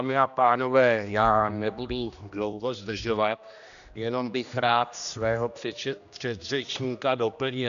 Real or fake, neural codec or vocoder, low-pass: fake; codec, 16 kHz, 1 kbps, X-Codec, HuBERT features, trained on general audio; 7.2 kHz